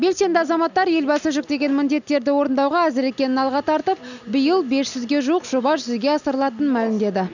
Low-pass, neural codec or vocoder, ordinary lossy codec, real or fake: 7.2 kHz; none; none; real